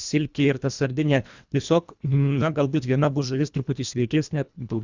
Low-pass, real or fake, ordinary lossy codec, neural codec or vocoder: 7.2 kHz; fake; Opus, 64 kbps; codec, 24 kHz, 1.5 kbps, HILCodec